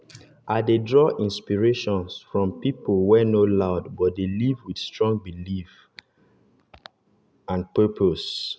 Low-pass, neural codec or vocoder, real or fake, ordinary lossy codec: none; none; real; none